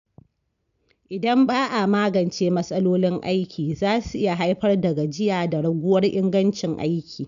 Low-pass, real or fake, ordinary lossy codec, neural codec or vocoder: 7.2 kHz; real; none; none